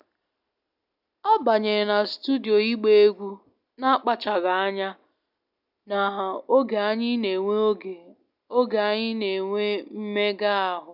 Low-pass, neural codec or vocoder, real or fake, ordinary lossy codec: 5.4 kHz; none; real; none